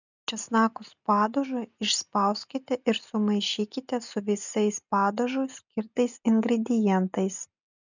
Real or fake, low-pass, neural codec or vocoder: real; 7.2 kHz; none